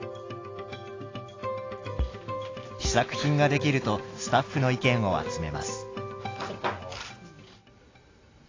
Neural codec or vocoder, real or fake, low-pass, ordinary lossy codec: none; real; 7.2 kHz; AAC, 32 kbps